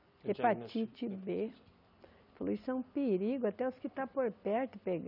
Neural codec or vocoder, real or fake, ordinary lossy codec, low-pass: none; real; none; 5.4 kHz